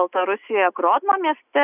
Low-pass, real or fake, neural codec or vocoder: 3.6 kHz; real; none